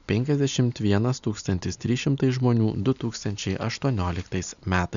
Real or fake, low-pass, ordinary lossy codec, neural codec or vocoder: real; 7.2 kHz; MP3, 96 kbps; none